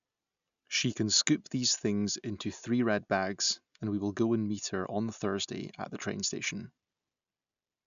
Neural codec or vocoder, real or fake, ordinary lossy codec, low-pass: none; real; none; 7.2 kHz